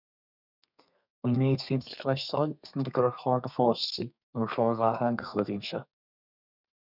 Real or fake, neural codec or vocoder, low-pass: fake; codec, 32 kHz, 1.9 kbps, SNAC; 5.4 kHz